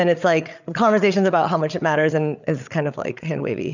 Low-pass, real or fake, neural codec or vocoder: 7.2 kHz; fake; codec, 16 kHz, 8 kbps, FreqCodec, larger model